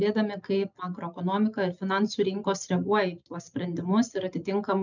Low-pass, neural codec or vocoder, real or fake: 7.2 kHz; none; real